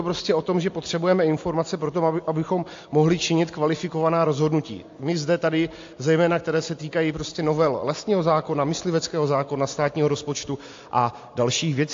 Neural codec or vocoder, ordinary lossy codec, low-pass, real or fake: none; AAC, 48 kbps; 7.2 kHz; real